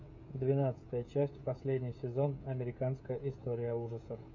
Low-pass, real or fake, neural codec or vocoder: 7.2 kHz; fake; codec, 16 kHz, 16 kbps, FreqCodec, smaller model